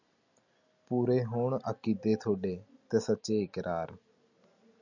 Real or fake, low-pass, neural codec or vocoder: real; 7.2 kHz; none